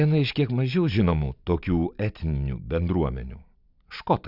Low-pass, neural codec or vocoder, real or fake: 5.4 kHz; none; real